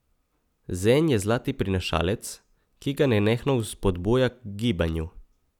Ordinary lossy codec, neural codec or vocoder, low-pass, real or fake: none; none; 19.8 kHz; real